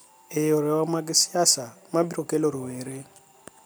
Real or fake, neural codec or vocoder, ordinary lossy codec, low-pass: real; none; none; none